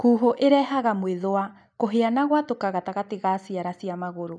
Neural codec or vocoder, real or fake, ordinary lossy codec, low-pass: none; real; MP3, 96 kbps; 9.9 kHz